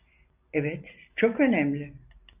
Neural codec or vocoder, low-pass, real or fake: none; 3.6 kHz; real